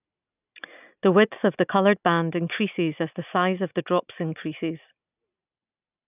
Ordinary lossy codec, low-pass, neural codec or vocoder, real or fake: none; 3.6 kHz; none; real